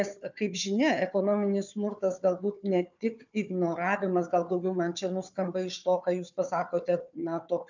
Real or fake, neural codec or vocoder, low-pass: fake; codec, 16 kHz, 4 kbps, FunCodec, trained on Chinese and English, 50 frames a second; 7.2 kHz